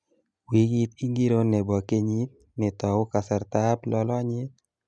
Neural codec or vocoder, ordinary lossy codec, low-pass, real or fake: none; none; 9.9 kHz; real